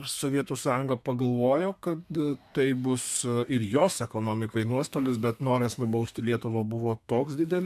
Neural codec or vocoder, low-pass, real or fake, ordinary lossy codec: codec, 32 kHz, 1.9 kbps, SNAC; 14.4 kHz; fake; MP3, 96 kbps